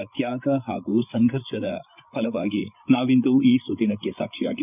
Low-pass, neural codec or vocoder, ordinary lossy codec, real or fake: 3.6 kHz; codec, 16 kHz, 8 kbps, FreqCodec, larger model; none; fake